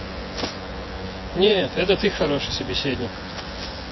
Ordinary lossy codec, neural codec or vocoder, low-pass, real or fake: MP3, 24 kbps; vocoder, 24 kHz, 100 mel bands, Vocos; 7.2 kHz; fake